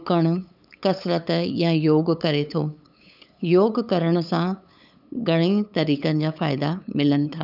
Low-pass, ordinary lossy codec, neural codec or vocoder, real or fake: 5.4 kHz; none; codec, 16 kHz, 8 kbps, FunCodec, trained on LibriTTS, 25 frames a second; fake